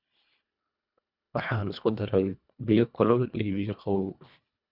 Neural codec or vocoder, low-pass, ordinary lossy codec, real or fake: codec, 24 kHz, 1.5 kbps, HILCodec; 5.4 kHz; none; fake